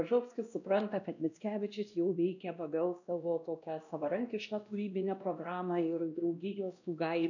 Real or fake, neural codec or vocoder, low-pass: fake; codec, 16 kHz, 1 kbps, X-Codec, WavLM features, trained on Multilingual LibriSpeech; 7.2 kHz